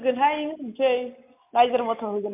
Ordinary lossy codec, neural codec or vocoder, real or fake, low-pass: MP3, 32 kbps; none; real; 3.6 kHz